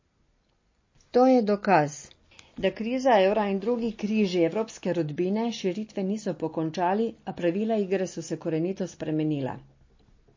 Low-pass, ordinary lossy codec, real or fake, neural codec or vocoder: 7.2 kHz; MP3, 32 kbps; real; none